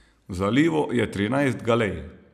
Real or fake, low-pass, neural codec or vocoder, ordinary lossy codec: real; 14.4 kHz; none; none